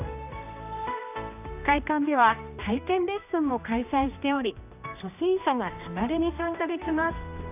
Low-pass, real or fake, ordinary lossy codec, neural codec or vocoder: 3.6 kHz; fake; none; codec, 16 kHz, 1 kbps, X-Codec, HuBERT features, trained on general audio